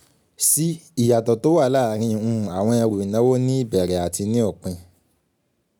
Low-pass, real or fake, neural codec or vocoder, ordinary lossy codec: none; real; none; none